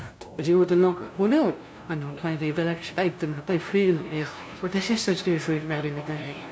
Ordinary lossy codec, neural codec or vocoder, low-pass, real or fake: none; codec, 16 kHz, 0.5 kbps, FunCodec, trained on LibriTTS, 25 frames a second; none; fake